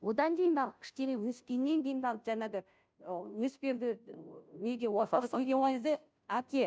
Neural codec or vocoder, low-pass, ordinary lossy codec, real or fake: codec, 16 kHz, 0.5 kbps, FunCodec, trained on Chinese and English, 25 frames a second; none; none; fake